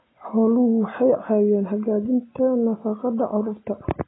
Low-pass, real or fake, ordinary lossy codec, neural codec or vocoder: 7.2 kHz; real; AAC, 16 kbps; none